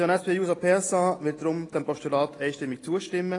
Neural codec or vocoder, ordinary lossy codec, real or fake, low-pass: none; AAC, 32 kbps; real; 10.8 kHz